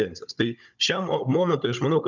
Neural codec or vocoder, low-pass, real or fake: codec, 16 kHz, 16 kbps, FunCodec, trained on Chinese and English, 50 frames a second; 7.2 kHz; fake